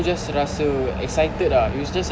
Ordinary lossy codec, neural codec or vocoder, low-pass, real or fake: none; none; none; real